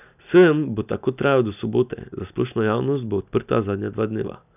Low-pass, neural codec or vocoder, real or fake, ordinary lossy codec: 3.6 kHz; none; real; none